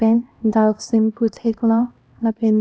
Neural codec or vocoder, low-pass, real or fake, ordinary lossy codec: codec, 16 kHz, 1 kbps, X-Codec, HuBERT features, trained on LibriSpeech; none; fake; none